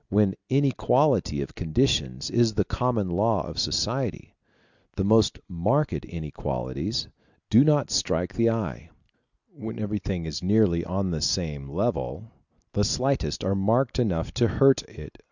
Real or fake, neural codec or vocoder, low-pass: real; none; 7.2 kHz